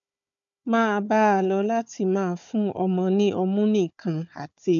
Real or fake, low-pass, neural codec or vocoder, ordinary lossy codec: fake; 7.2 kHz; codec, 16 kHz, 4 kbps, FunCodec, trained on Chinese and English, 50 frames a second; none